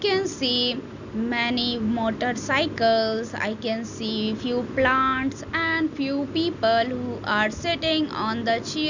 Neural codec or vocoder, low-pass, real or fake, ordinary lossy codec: none; 7.2 kHz; real; none